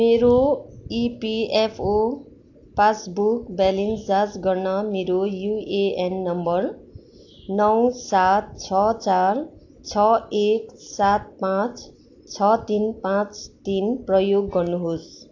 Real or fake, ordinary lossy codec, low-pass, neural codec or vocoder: real; AAC, 48 kbps; 7.2 kHz; none